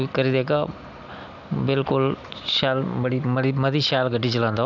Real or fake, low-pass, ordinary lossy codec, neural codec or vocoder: real; 7.2 kHz; none; none